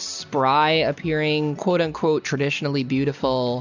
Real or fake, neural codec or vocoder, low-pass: real; none; 7.2 kHz